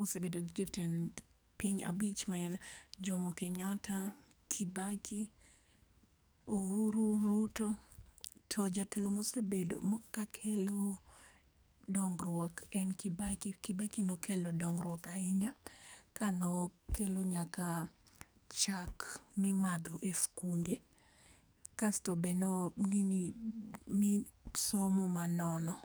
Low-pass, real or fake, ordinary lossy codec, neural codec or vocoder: none; fake; none; codec, 44.1 kHz, 2.6 kbps, SNAC